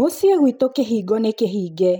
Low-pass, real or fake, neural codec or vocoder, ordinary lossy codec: none; real; none; none